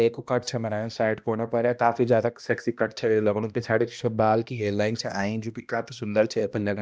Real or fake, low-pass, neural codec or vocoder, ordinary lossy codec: fake; none; codec, 16 kHz, 1 kbps, X-Codec, HuBERT features, trained on balanced general audio; none